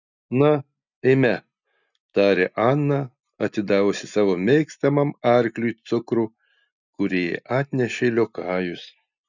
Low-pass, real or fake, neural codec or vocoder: 7.2 kHz; real; none